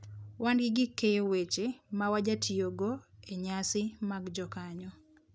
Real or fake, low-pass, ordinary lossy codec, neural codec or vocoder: real; none; none; none